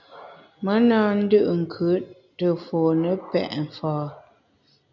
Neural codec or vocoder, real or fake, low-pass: none; real; 7.2 kHz